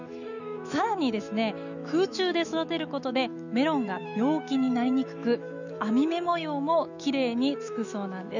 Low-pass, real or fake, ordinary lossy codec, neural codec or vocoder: 7.2 kHz; fake; none; autoencoder, 48 kHz, 128 numbers a frame, DAC-VAE, trained on Japanese speech